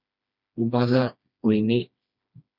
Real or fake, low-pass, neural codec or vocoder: fake; 5.4 kHz; codec, 16 kHz, 2 kbps, FreqCodec, smaller model